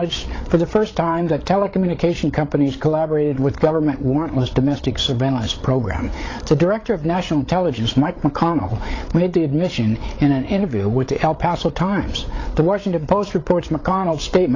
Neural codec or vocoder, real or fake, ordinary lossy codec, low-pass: codec, 16 kHz, 8 kbps, FreqCodec, larger model; fake; AAC, 32 kbps; 7.2 kHz